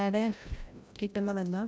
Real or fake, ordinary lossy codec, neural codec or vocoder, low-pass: fake; none; codec, 16 kHz, 0.5 kbps, FreqCodec, larger model; none